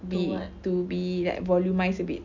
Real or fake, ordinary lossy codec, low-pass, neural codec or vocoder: real; none; 7.2 kHz; none